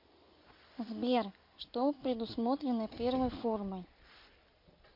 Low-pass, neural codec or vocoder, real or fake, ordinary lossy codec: 5.4 kHz; none; real; MP3, 32 kbps